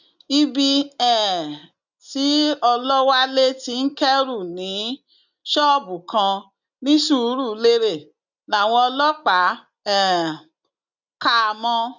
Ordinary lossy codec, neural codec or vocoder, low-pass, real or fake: none; none; 7.2 kHz; real